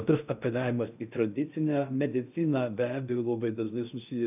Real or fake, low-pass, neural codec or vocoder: fake; 3.6 kHz; codec, 16 kHz in and 24 kHz out, 0.6 kbps, FocalCodec, streaming, 4096 codes